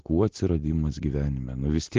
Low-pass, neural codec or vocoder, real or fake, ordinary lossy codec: 7.2 kHz; none; real; Opus, 16 kbps